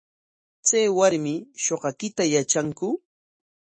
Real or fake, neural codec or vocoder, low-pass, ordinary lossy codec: fake; autoencoder, 48 kHz, 128 numbers a frame, DAC-VAE, trained on Japanese speech; 9.9 kHz; MP3, 32 kbps